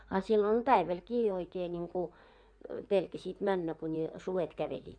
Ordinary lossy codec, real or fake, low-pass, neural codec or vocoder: none; fake; 9.9 kHz; codec, 16 kHz in and 24 kHz out, 2.2 kbps, FireRedTTS-2 codec